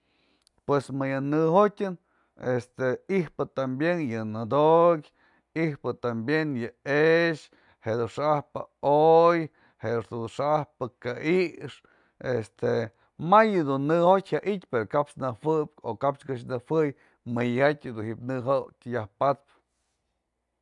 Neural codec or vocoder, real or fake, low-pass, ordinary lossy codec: none; real; 10.8 kHz; none